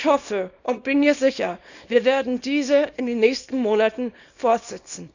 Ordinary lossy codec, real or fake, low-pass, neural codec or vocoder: none; fake; 7.2 kHz; codec, 24 kHz, 0.9 kbps, WavTokenizer, small release